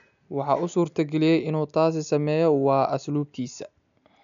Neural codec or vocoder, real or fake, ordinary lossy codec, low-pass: none; real; none; 7.2 kHz